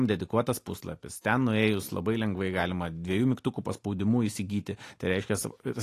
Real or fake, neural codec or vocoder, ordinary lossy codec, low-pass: real; none; AAC, 48 kbps; 14.4 kHz